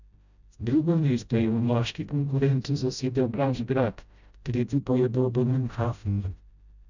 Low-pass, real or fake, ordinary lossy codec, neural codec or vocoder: 7.2 kHz; fake; none; codec, 16 kHz, 0.5 kbps, FreqCodec, smaller model